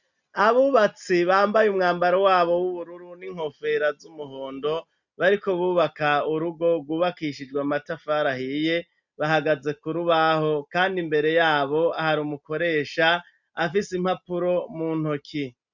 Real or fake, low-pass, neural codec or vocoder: real; 7.2 kHz; none